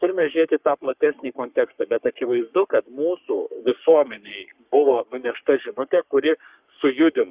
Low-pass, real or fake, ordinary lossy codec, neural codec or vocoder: 3.6 kHz; fake; Opus, 64 kbps; codec, 44.1 kHz, 3.4 kbps, Pupu-Codec